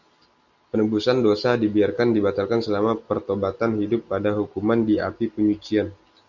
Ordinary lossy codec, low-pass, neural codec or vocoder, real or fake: Opus, 64 kbps; 7.2 kHz; none; real